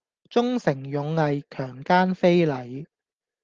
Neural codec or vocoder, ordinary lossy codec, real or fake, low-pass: none; Opus, 16 kbps; real; 7.2 kHz